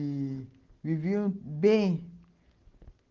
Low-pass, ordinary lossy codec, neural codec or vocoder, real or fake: 7.2 kHz; Opus, 16 kbps; none; real